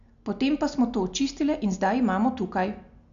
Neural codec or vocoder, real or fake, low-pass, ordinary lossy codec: none; real; 7.2 kHz; none